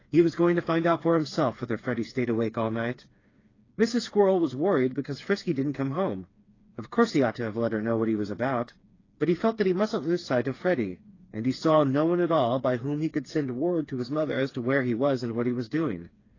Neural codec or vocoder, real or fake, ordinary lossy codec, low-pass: codec, 16 kHz, 4 kbps, FreqCodec, smaller model; fake; AAC, 32 kbps; 7.2 kHz